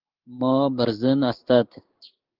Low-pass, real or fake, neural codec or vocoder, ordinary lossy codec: 5.4 kHz; fake; codec, 16 kHz in and 24 kHz out, 1 kbps, XY-Tokenizer; Opus, 24 kbps